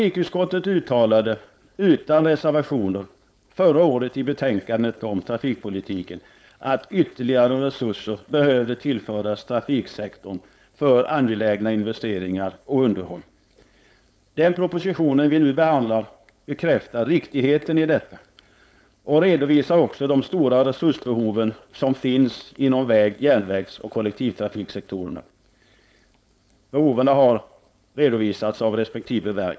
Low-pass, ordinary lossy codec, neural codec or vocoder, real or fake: none; none; codec, 16 kHz, 4.8 kbps, FACodec; fake